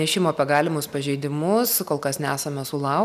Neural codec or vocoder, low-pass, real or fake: none; 14.4 kHz; real